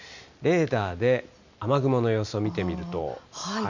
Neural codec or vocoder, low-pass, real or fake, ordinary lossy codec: none; 7.2 kHz; real; none